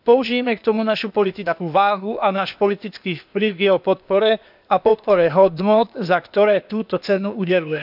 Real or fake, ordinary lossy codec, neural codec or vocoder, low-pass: fake; none; codec, 16 kHz, 0.8 kbps, ZipCodec; 5.4 kHz